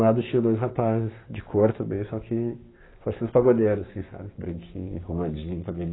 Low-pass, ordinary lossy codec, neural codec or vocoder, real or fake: 7.2 kHz; AAC, 16 kbps; codec, 16 kHz, 6 kbps, DAC; fake